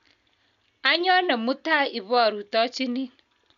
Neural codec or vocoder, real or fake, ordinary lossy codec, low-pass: none; real; none; 7.2 kHz